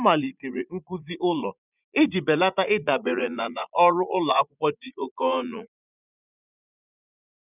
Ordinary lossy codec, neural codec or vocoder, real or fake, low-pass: none; vocoder, 44.1 kHz, 80 mel bands, Vocos; fake; 3.6 kHz